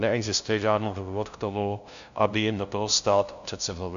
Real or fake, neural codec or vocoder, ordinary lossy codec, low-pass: fake; codec, 16 kHz, 0.5 kbps, FunCodec, trained on LibriTTS, 25 frames a second; AAC, 96 kbps; 7.2 kHz